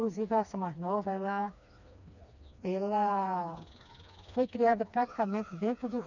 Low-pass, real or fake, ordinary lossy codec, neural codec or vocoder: 7.2 kHz; fake; none; codec, 16 kHz, 2 kbps, FreqCodec, smaller model